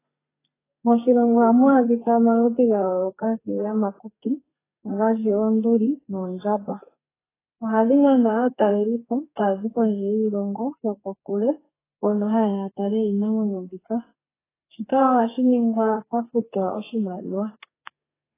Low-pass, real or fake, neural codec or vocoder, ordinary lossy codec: 3.6 kHz; fake; codec, 32 kHz, 1.9 kbps, SNAC; AAC, 16 kbps